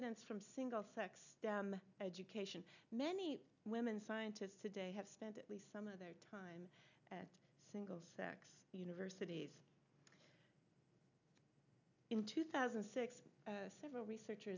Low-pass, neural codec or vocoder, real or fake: 7.2 kHz; none; real